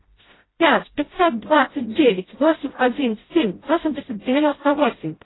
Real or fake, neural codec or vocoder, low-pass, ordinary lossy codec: fake; codec, 16 kHz, 0.5 kbps, FreqCodec, smaller model; 7.2 kHz; AAC, 16 kbps